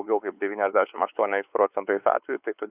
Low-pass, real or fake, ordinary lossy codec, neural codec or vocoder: 3.6 kHz; fake; Opus, 64 kbps; codec, 16 kHz, 4 kbps, X-Codec, WavLM features, trained on Multilingual LibriSpeech